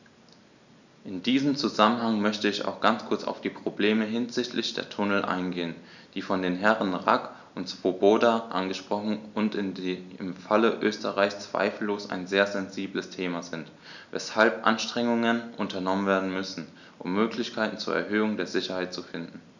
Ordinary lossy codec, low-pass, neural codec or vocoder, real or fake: none; 7.2 kHz; none; real